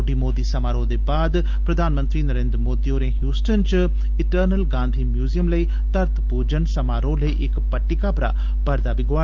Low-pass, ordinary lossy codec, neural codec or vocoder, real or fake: 7.2 kHz; Opus, 32 kbps; none; real